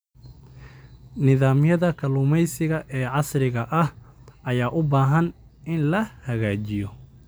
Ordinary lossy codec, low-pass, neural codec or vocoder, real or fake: none; none; none; real